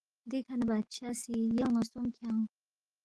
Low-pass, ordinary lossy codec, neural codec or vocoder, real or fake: 10.8 kHz; Opus, 16 kbps; autoencoder, 48 kHz, 128 numbers a frame, DAC-VAE, trained on Japanese speech; fake